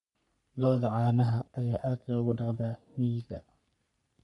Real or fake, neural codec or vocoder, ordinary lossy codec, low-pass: fake; codec, 44.1 kHz, 3.4 kbps, Pupu-Codec; none; 10.8 kHz